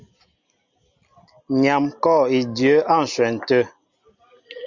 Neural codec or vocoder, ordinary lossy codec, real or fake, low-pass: none; Opus, 64 kbps; real; 7.2 kHz